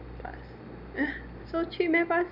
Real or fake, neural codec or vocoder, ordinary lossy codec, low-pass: real; none; none; 5.4 kHz